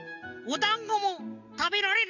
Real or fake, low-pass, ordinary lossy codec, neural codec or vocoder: real; 7.2 kHz; none; none